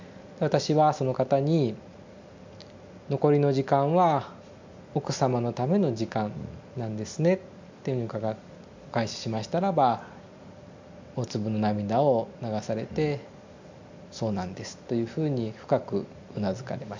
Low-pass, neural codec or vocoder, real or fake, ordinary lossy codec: 7.2 kHz; none; real; MP3, 64 kbps